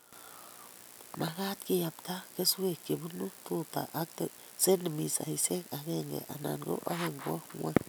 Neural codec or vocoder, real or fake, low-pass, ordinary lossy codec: none; real; none; none